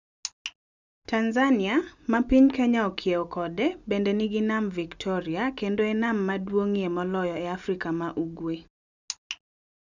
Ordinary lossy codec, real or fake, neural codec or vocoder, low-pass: none; real; none; 7.2 kHz